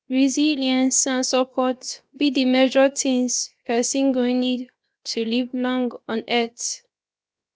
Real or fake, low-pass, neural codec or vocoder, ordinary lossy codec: fake; none; codec, 16 kHz, 0.7 kbps, FocalCodec; none